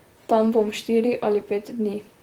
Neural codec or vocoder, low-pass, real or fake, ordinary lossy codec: vocoder, 44.1 kHz, 128 mel bands, Pupu-Vocoder; 19.8 kHz; fake; Opus, 24 kbps